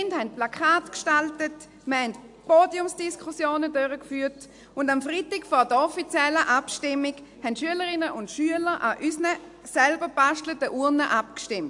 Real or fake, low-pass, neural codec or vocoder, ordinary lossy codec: real; 10.8 kHz; none; AAC, 64 kbps